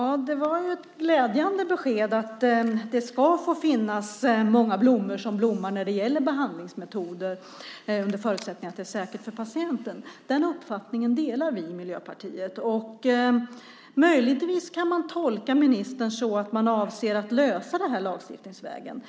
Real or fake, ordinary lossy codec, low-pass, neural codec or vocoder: real; none; none; none